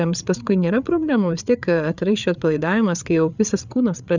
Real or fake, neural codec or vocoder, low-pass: fake; codec, 16 kHz, 8 kbps, FreqCodec, larger model; 7.2 kHz